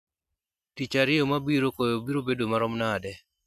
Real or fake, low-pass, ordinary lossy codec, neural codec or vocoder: real; 14.4 kHz; none; none